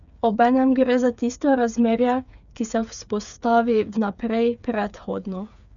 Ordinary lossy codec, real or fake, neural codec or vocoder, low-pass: none; fake; codec, 16 kHz, 8 kbps, FreqCodec, smaller model; 7.2 kHz